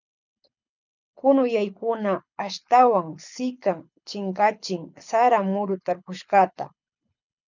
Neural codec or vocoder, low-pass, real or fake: codec, 24 kHz, 6 kbps, HILCodec; 7.2 kHz; fake